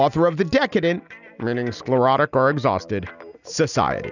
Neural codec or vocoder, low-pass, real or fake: none; 7.2 kHz; real